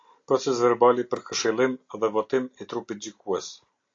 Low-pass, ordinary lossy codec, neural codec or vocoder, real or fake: 7.2 kHz; AAC, 48 kbps; none; real